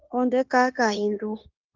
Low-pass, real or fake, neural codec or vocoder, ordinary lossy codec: 7.2 kHz; fake; codec, 16 kHz, 0.9 kbps, LongCat-Audio-Codec; Opus, 24 kbps